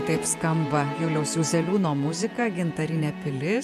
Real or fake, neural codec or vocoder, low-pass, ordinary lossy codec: fake; vocoder, 48 kHz, 128 mel bands, Vocos; 14.4 kHz; MP3, 96 kbps